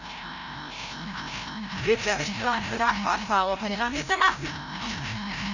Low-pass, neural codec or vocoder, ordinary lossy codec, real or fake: 7.2 kHz; codec, 16 kHz, 0.5 kbps, FreqCodec, larger model; none; fake